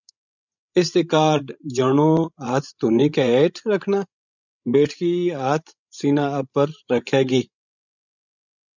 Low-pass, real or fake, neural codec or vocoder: 7.2 kHz; fake; codec, 16 kHz, 16 kbps, FreqCodec, larger model